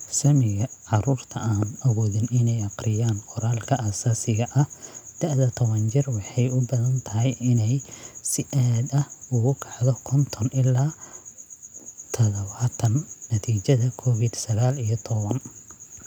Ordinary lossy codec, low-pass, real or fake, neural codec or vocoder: none; 19.8 kHz; real; none